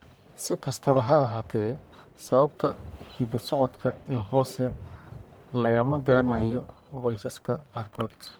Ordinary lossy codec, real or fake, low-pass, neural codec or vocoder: none; fake; none; codec, 44.1 kHz, 1.7 kbps, Pupu-Codec